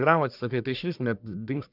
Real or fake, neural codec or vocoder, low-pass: fake; codec, 44.1 kHz, 1.7 kbps, Pupu-Codec; 5.4 kHz